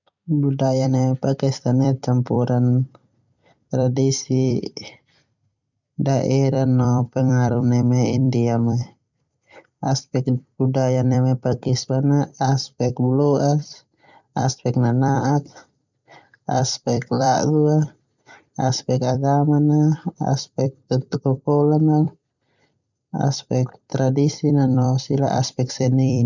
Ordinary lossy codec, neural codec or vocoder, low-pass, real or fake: none; vocoder, 44.1 kHz, 128 mel bands every 256 samples, BigVGAN v2; 7.2 kHz; fake